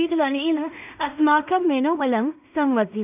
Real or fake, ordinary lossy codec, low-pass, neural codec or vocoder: fake; none; 3.6 kHz; codec, 16 kHz in and 24 kHz out, 0.4 kbps, LongCat-Audio-Codec, two codebook decoder